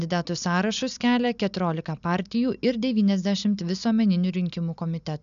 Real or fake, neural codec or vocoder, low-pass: real; none; 7.2 kHz